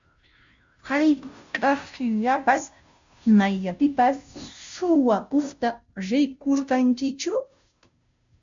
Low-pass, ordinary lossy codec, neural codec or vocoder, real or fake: 7.2 kHz; MP3, 64 kbps; codec, 16 kHz, 0.5 kbps, FunCodec, trained on Chinese and English, 25 frames a second; fake